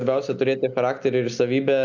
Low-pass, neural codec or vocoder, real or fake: 7.2 kHz; none; real